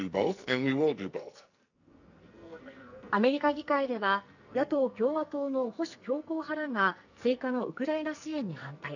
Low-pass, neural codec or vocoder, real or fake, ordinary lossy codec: 7.2 kHz; codec, 44.1 kHz, 2.6 kbps, SNAC; fake; none